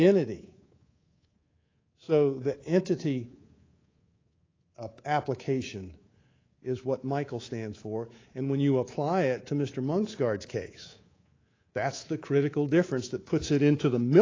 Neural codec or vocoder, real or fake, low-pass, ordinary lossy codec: codec, 24 kHz, 3.1 kbps, DualCodec; fake; 7.2 kHz; AAC, 32 kbps